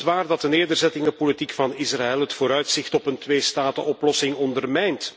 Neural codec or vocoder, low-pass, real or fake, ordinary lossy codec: none; none; real; none